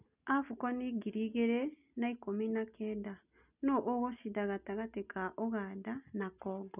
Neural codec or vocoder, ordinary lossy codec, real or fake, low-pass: none; none; real; 3.6 kHz